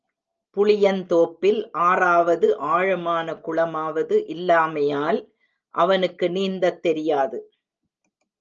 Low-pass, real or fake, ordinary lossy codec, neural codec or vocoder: 7.2 kHz; real; Opus, 24 kbps; none